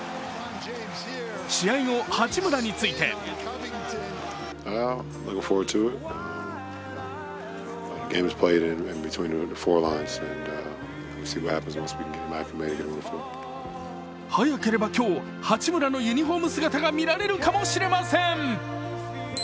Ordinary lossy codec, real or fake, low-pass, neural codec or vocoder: none; real; none; none